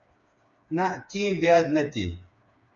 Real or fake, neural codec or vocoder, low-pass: fake; codec, 16 kHz, 4 kbps, FreqCodec, smaller model; 7.2 kHz